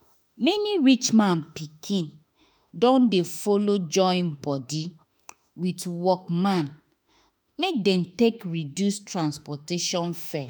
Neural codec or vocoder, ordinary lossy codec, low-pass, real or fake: autoencoder, 48 kHz, 32 numbers a frame, DAC-VAE, trained on Japanese speech; none; none; fake